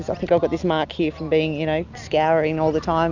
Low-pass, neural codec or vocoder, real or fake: 7.2 kHz; none; real